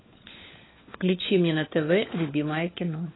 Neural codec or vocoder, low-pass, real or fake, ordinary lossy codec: codec, 16 kHz, 4 kbps, FunCodec, trained on LibriTTS, 50 frames a second; 7.2 kHz; fake; AAC, 16 kbps